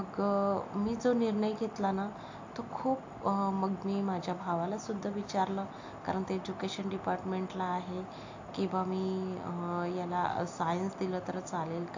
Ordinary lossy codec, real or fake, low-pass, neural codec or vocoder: AAC, 48 kbps; real; 7.2 kHz; none